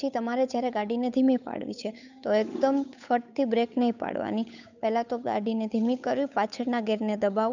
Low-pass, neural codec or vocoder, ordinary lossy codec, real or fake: 7.2 kHz; codec, 16 kHz, 8 kbps, FunCodec, trained on Chinese and English, 25 frames a second; none; fake